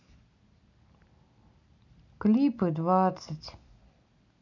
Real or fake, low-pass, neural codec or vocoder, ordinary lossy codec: real; 7.2 kHz; none; none